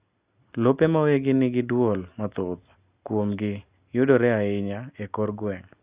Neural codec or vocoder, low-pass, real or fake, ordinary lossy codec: none; 3.6 kHz; real; Opus, 64 kbps